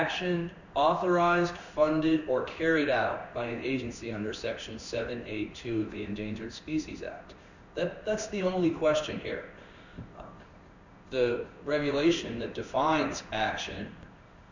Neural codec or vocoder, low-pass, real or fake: codec, 16 kHz in and 24 kHz out, 1 kbps, XY-Tokenizer; 7.2 kHz; fake